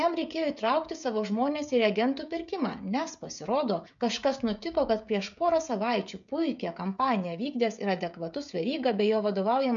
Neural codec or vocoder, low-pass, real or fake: codec, 16 kHz, 16 kbps, FreqCodec, smaller model; 7.2 kHz; fake